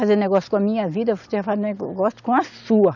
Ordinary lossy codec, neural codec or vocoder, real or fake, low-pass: none; none; real; 7.2 kHz